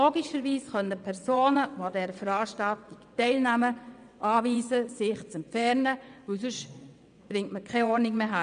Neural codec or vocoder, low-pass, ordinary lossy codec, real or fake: vocoder, 22.05 kHz, 80 mel bands, WaveNeXt; 9.9 kHz; MP3, 96 kbps; fake